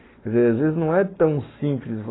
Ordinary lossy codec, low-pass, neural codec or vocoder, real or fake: AAC, 16 kbps; 7.2 kHz; codec, 44.1 kHz, 7.8 kbps, Pupu-Codec; fake